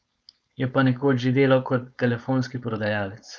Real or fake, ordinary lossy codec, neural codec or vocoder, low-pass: fake; none; codec, 16 kHz, 4.8 kbps, FACodec; none